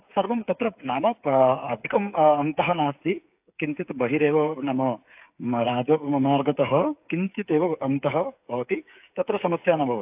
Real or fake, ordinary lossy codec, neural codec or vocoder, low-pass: fake; AAC, 32 kbps; codec, 16 kHz, 8 kbps, FreqCodec, smaller model; 3.6 kHz